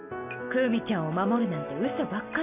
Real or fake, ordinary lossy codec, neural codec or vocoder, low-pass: real; none; none; 3.6 kHz